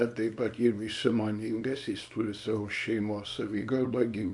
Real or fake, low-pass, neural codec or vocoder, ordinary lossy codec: fake; 10.8 kHz; codec, 24 kHz, 0.9 kbps, WavTokenizer, small release; MP3, 64 kbps